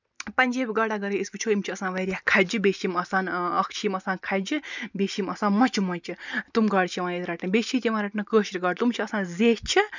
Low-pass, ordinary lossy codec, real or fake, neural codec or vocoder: 7.2 kHz; none; real; none